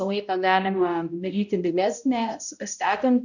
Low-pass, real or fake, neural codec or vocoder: 7.2 kHz; fake; codec, 16 kHz, 0.5 kbps, X-Codec, HuBERT features, trained on balanced general audio